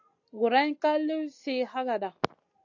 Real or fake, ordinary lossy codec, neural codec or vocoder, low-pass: real; AAC, 48 kbps; none; 7.2 kHz